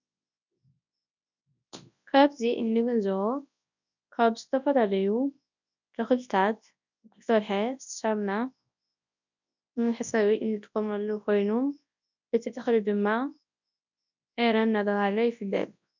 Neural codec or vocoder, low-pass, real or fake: codec, 24 kHz, 0.9 kbps, WavTokenizer, large speech release; 7.2 kHz; fake